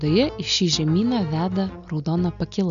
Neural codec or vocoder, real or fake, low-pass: none; real; 7.2 kHz